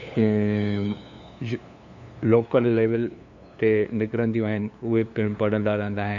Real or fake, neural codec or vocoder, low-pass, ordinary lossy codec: fake; codec, 16 kHz, 2 kbps, FunCodec, trained on LibriTTS, 25 frames a second; 7.2 kHz; none